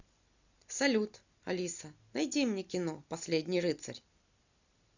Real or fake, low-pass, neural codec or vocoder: real; 7.2 kHz; none